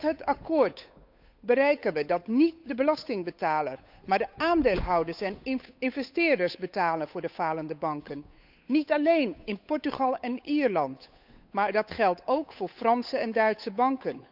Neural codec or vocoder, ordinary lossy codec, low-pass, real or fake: codec, 16 kHz, 8 kbps, FunCodec, trained on Chinese and English, 25 frames a second; none; 5.4 kHz; fake